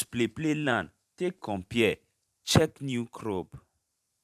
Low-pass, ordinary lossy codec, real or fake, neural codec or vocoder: 14.4 kHz; none; fake; vocoder, 44.1 kHz, 128 mel bands every 512 samples, BigVGAN v2